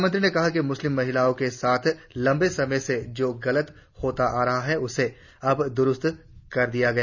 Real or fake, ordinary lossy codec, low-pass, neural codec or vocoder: real; none; 7.2 kHz; none